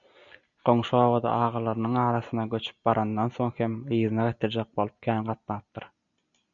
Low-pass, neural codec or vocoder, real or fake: 7.2 kHz; none; real